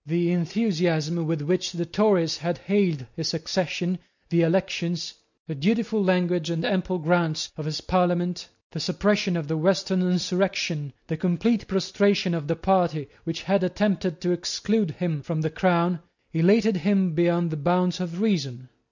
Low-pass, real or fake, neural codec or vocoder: 7.2 kHz; real; none